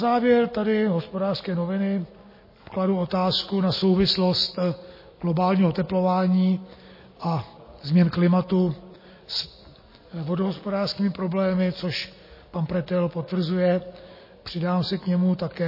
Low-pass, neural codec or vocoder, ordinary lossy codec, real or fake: 5.4 kHz; none; MP3, 24 kbps; real